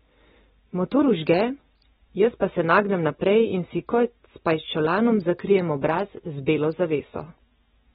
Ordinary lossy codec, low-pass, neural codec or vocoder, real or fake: AAC, 16 kbps; 19.8 kHz; none; real